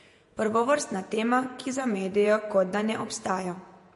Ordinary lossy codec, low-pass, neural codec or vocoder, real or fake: MP3, 48 kbps; 14.4 kHz; vocoder, 48 kHz, 128 mel bands, Vocos; fake